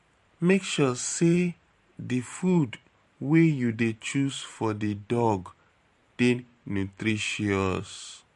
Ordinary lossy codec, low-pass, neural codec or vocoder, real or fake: MP3, 48 kbps; 14.4 kHz; vocoder, 44.1 kHz, 128 mel bands every 512 samples, BigVGAN v2; fake